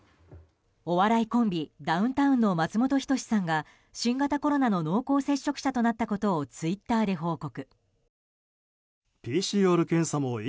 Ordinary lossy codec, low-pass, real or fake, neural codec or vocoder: none; none; real; none